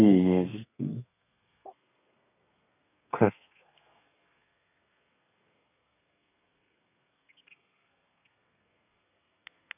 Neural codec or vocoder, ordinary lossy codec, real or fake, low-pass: codec, 32 kHz, 1.9 kbps, SNAC; none; fake; 3.6 kHz